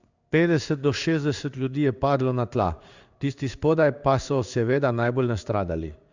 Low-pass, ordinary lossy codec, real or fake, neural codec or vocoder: 7.2 kHz; Opus, 64 kbps; fake; codec, 16 kHz in and 24 kHz out, 1 kbps, XY-Tokenizer